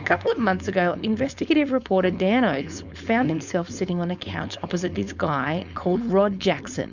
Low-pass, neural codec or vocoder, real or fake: 7.2 kHz; codec, 16 kHz, 4.8 kbps, FACodec; fake